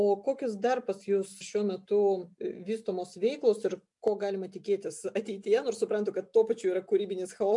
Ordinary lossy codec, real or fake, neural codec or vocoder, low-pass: MP3, 64 kbps; real; none; 10.8 kHz